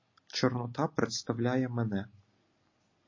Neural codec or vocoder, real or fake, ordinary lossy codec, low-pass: none; real; MP3, 32 kbps; 7.2 kHz